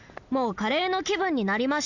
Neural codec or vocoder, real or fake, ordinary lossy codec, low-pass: none; real; none; 7.2 kHz